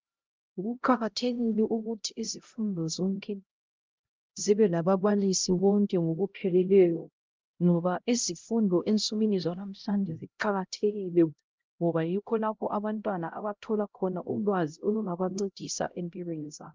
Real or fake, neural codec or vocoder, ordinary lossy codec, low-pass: fake; codec, 16 kHz, 0.5 kbps, X-Codec, HuBERT features, trained on LibriSpeech; Opus, 32 kbps; 7.2 kHz